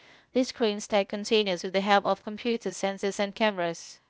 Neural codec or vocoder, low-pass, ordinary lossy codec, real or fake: codec, 16 kHz, 0.8 kbps, ZipCodec; none; none; fake